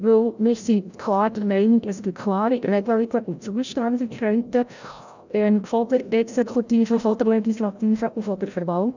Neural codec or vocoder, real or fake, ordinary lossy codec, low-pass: codec, 16 kHz, 0.5 kbps, FreqCodec, larger model; fake; none; 7.2 kHz